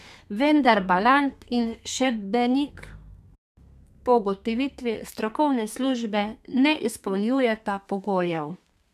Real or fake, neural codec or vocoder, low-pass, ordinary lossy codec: fake; codec, 32 kHz, 1.9 kbps, SNAC; 14.4 kHz; none